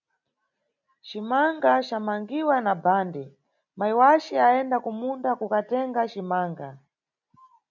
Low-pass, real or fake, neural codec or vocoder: 7.2 kHz; real; none